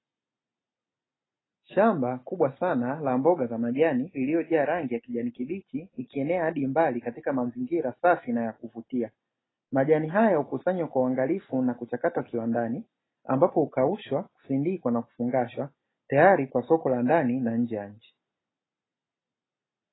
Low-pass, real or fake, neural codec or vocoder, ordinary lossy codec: 7.2 kHz; real; none; AAC, 16 kbps